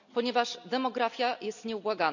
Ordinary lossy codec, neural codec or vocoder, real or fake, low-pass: none; none; real; 7.2 kHz